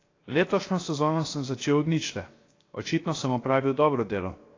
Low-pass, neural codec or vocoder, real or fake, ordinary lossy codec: 7.2 kHz; codec, 16 kHz, 0.7 kbps, FocalCodec; fake; AAC, 32 kbps